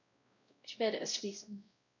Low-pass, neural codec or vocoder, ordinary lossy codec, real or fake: 7.2 kHz; codec, 16 kHz, 0.5 kbps, X-Codec, WavLM features, trained on Multilingual LibriSpeech; none; fake